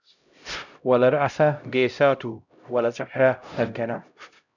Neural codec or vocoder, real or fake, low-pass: codec, 16 kHz, 0.5 kbps, X-Codec, HuBERT features, trained on LibriSpeech; fake; 7.2 kHz